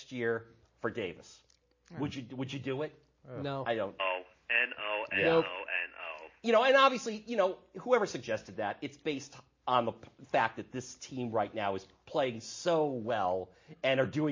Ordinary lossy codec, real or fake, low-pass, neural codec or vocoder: MP3, 32 kbps; real; 7.2 kHz; none